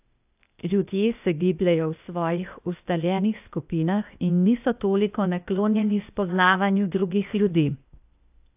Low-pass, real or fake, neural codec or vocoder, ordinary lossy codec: 3.6 kHz; fake; codec, 16 kHz, 0.8 kbps, ZipCodec; AAC, 32 kbps